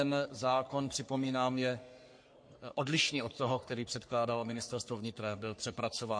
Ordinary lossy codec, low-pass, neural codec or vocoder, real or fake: MP3, 48 kbps; 9.9 kHz; codec, 44.1 kHz, 3.4 kbps, Pupu-Codec; fake